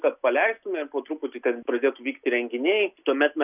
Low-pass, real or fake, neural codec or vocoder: 3.6 kHz; real; none